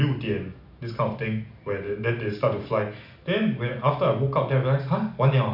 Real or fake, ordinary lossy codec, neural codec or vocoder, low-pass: real; none; none; 5.4 kHz